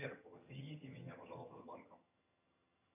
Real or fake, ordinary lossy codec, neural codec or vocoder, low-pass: fake; MP3, 32 kbps; vocoder, 22.05 kHz, 80 mel bands, HiFi-GAN; 3.6 kHz